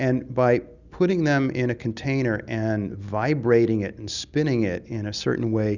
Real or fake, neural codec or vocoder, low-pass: real; none; 7.2 kHz